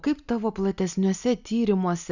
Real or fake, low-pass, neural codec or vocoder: real; 7.2 kHz; none